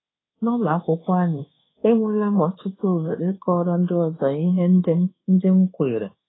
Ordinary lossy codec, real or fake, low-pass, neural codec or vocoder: AAC, 16 kbps; fake; 7.2 kHz; codec, 24 kHz, 1.2 kbps, DualCodec